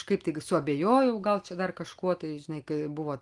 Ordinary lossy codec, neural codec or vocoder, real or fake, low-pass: Opus, 24 kbps; none; real; 10.8 kHz